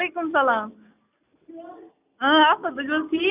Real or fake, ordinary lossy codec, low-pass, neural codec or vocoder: real; none; 3.6 kHz; none